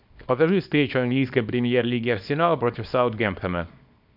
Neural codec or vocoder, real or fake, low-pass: codec, 24 kHz, 0.9 kbps, WavTokenizer, small release; fake; 5.4 kHz